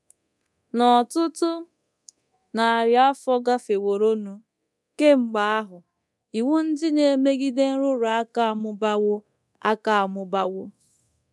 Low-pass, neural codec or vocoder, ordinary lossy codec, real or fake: none; codec, 24 kHz, 0.9 kbps, DualCodec; none; fake